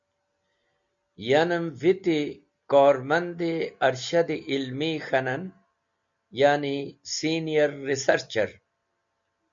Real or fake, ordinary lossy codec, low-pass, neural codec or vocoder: real; MP3, 64 kbps; 7.2 kHz; none